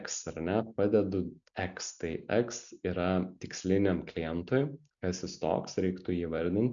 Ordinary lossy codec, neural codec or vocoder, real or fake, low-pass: MP3, 96 kbps; none; real; 7.2 kHz